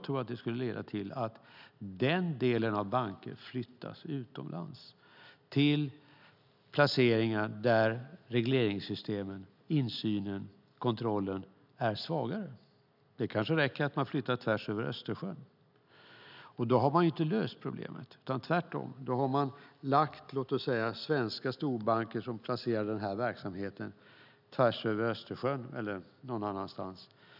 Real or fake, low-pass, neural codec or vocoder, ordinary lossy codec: real; 5.4 kHz; none; none